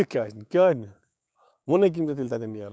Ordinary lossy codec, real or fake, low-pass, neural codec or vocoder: none; fake; none; codec, 16 kHz, 6 kbps, DAC